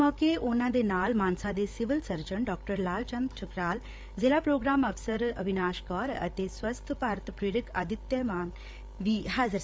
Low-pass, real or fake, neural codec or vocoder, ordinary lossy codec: none; fake; codec, 16 kHz, 8 kbps, FreqCodec, larger model; none